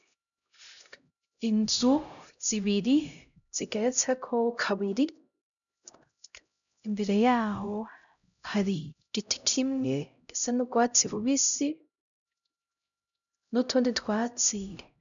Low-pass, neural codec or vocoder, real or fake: 7.2 kHz; codec, 16 kHz, 0.5 kbps, X-Codec, HuBERT features, trained on LibriSpeech; fake